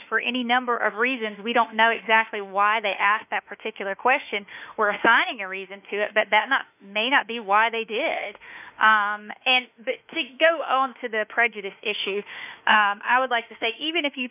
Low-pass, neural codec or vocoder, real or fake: 3.6 kHz; autoencoder, 48 kHz, 32 numbers a frame, DAC-VAE, trained on Japanese speech; fake